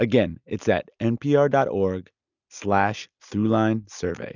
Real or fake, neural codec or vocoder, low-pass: real; none; 7.2 kHz